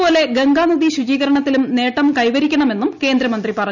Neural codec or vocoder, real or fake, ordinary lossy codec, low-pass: none; real; none; 7.2 kHz